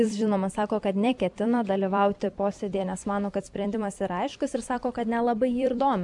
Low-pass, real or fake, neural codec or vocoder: 10.8 kHz; fake; vocoder, 44.1 kHz, 128 mel bands every 256 samples, BigVGAN v2